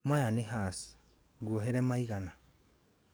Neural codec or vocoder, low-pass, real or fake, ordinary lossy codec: codec, 44.1 kHz, 7.8 kbps, DAC; none; fake; none